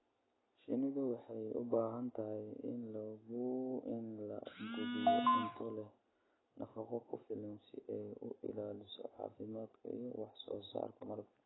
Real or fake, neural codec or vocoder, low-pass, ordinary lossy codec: real; none; 7.2 kHz; AAC, 16 kbps